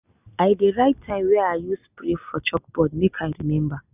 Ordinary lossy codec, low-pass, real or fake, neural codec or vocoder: none; 3.6 kHz; real; none